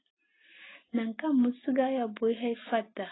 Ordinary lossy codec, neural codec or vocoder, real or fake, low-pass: AAC, 16 kbps; none; real; 7.2 kHz